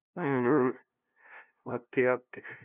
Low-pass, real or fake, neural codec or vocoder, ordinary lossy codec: 3.6 kHz; fake; codec, 16 kHz, 0.5 kbps, FunCodec, trained on LibriTTS, 25 frames a second; none